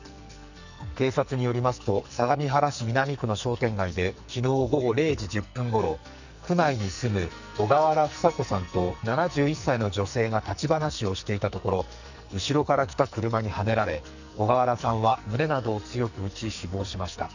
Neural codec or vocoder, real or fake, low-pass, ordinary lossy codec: codec, 44.1 kHz, 2.6 kbps, SNAC; fake; 7.2 kHz; none